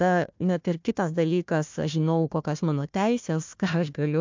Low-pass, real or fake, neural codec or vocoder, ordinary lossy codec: 7.2 kHz; fake; codec, 16 kHz, 1 kbps, FunCodec, trained on Chinese and English, 50 frames a second; MP3, 64 kbps